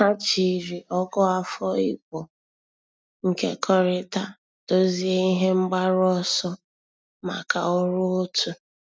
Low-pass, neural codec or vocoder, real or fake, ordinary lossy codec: none; none; real; none